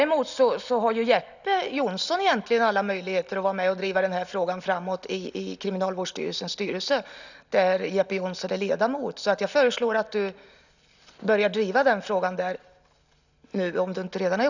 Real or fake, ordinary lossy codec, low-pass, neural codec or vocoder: real; none; 7.2 kHz; none